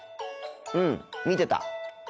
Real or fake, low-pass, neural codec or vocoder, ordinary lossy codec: real; none; none; none